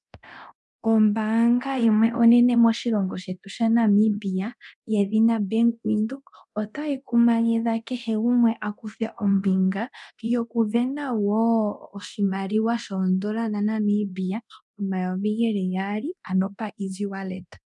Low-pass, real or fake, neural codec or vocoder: 10.8 kHz; fake; codec, 24 kHz, 0.9 kbps, DualCodec